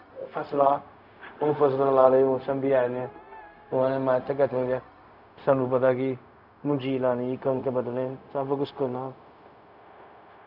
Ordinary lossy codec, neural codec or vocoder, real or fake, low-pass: none; codec, 16 kHz, 0.4 kbps, LongCat-Audio-Codec; fake; 5.4 kHz